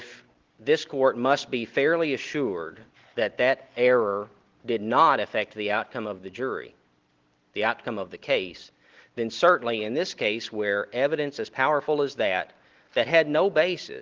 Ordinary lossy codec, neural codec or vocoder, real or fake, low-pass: Opus, 16 kbps; none; real; 7.2 kHz